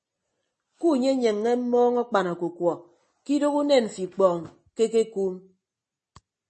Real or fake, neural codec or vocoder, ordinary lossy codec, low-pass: real; none; MP3, 32 kbps; 10.8 kHz